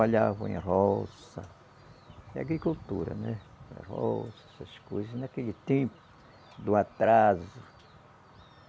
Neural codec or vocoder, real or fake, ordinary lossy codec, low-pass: none; real; none; none